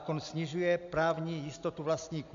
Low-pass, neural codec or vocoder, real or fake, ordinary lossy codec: 7.2 kHz; none; real; MP3, 64 kbps